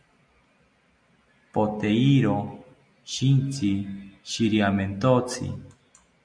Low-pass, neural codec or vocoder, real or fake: 9.9 kHz; none; real